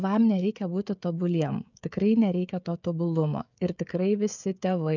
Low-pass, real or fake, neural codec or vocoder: 7.2 kHz; fake; codec, 16 kHz, 16 kbps, FreqCodec, smaller model